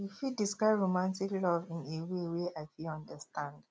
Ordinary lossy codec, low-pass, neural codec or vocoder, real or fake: none; none; none; real